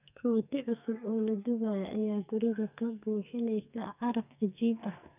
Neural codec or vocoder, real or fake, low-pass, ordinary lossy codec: codec, 44.1 kHz, 2.6 kbps, SNAC; fake; 3.6 kHz; none